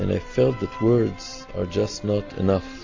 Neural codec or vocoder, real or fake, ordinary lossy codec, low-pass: none; real; AAC, 48 kbps; 7.2 kHz